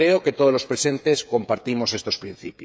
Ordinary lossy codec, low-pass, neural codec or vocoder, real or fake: none; none; codec, 16 kHz, 8 kbps, FreqCodec, smaller model; fake